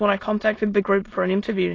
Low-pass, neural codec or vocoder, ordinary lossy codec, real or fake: 7.2 kHz; autoencoder, 22.05 kHz, a latent of 192 numbers a frame, VITS, trained on many speakers; AAC, 32 kbps; fake